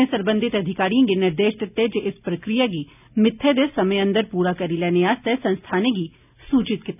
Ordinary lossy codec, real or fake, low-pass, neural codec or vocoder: none; real; 3.6 kHz; none